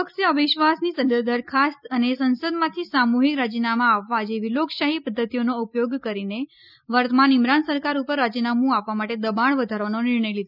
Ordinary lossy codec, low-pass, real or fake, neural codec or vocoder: none; 5.4 kHz; real; none